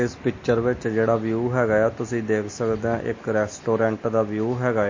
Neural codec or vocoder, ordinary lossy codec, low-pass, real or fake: none; MP3, 32 kbps; 7.2 kHz; real